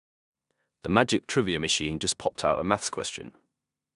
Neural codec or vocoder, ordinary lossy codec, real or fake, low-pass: codec, 16 kHz in and 24 kHz out, 0.9 kbps, LongCat-Audio-Codec, four codebook decoder; none; fake; 10.8 kHz